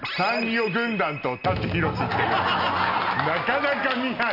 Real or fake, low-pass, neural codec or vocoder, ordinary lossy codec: real; 5.4 kHz; none; none